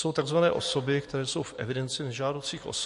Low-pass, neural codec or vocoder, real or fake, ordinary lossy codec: 14.4 kHz; vocoder, 44.1 kHz, 128 mel bands every 256 samples, BigVGAN v2; fake; MP3, 48 kbps